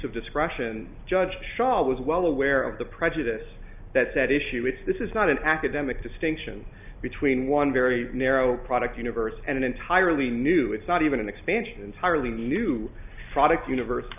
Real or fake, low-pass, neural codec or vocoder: real; 3.6 kHz; none